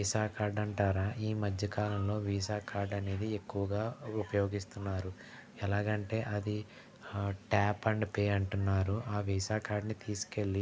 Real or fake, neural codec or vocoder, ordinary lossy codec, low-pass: real; none; none; none